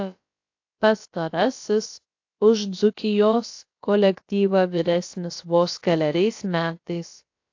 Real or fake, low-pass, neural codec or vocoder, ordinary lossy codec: fake; 7.2 kHz; codec, 16 kHz, about 1 kbps, DyCAST, with the encoder's durations; AAC, 48 kbps